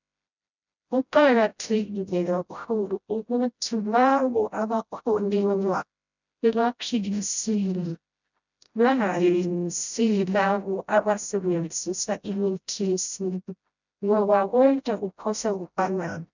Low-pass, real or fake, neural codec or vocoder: 7.2 kHz; fake; codec, 16 kHz, 0.5 kbps, FreqCodec, smaller model